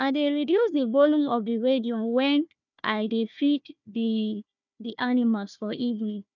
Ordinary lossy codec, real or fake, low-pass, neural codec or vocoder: none; fake; 7.2 kHz; codec, 16 kHz, 1 kbps, FunCodec, trained on Chinese and English, 50 frames a second